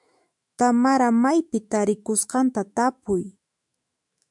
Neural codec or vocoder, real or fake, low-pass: autoencoder, 48 kHz, 128 numbers a frame, DAC-VAE, trained on Japanese speech; fake; 10.8 kHz